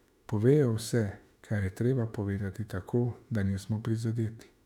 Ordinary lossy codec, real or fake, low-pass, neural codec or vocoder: none; fake; 19.8 kHz; autoencoder, 48 kHz, 32 numbers a frame, DAC-VAE, trained on Japanese speech